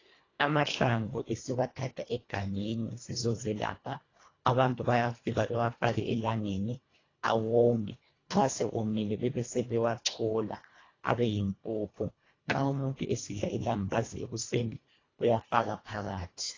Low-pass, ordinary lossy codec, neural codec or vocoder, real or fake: 7.2 kHz; AAC, 32 kbps; codec, 24 kHz, 1.5 kbps, HILCodec; fake